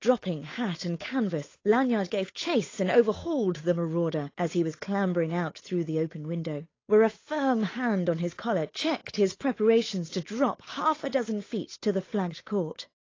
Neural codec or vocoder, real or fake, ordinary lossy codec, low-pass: codec, 16 kHz, 8 kbps, FunCodec, trained on Chinese and English, 25 frames a second; fake; AAC, 32 kbps; 7.2 kHz